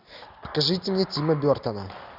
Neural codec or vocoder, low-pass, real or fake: none; 5.4 kHz; real